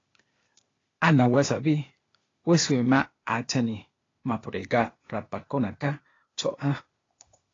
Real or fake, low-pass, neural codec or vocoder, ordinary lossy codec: fake; 7.2 kHz; codec, 16 kHz, 0.8 kbps, ZipCodec; AAC, 32 kbps